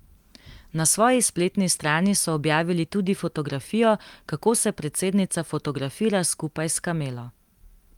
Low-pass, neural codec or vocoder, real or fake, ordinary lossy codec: 19.8 kHz; none; real; Opus, 32 kbps